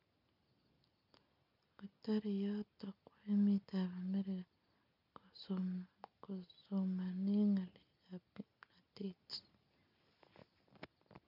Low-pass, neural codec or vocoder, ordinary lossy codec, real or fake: 5.4 kHz; none; none; real